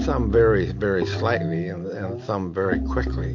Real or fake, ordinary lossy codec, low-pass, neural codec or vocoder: real; MP3, 48 kbps; 7.2 kHz; none